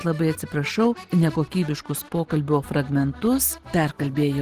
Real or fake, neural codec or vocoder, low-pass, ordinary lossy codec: real; none; 14.4 kHz; Opus, 16 kbps